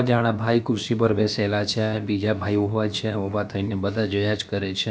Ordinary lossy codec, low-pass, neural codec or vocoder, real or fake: none; none; codec, 16 kHz, about 1 kbps, DyCAST, with the encoder's durations; fake